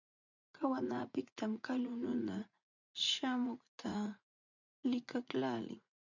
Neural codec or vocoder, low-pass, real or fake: vocoder, 44.1 kHz, 80 mel bands, Vocos; 7.2 kHz; fake